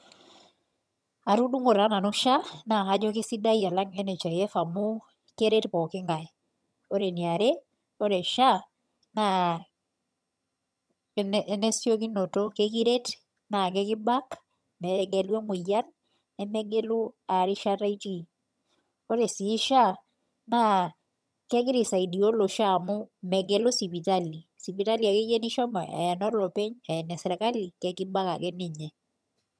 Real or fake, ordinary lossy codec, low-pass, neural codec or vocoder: fake; none; none; vocoder, 22.05 kHz, 80 mel bands, HiFi-GAN